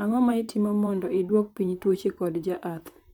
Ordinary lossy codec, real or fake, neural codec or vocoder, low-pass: none; fake; vocoder, 44.1 kHz, 128 mel bands, Pupu-Vocoder; 19.8 kHz